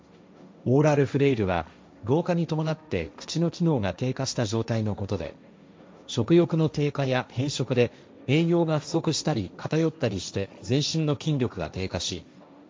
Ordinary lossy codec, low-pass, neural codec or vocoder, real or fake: none; none; codec, 16 kHz, 1.1 kbps, Voila-Tokenizer; fake